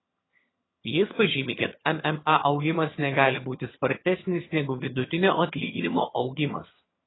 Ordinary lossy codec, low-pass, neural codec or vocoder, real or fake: AAC, 16 kbps; 7.2 kHz; vocoder, 22.05 kHz, 80 mel bands, HiFi-GAN; fake